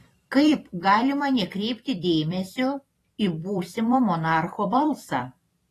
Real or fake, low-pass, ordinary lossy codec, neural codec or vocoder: fake; 14.4 kHz; AAC, 48 kbps; vocoder, 44.1 kHz, 128 mel bands every 256 samples, BigVGAN v2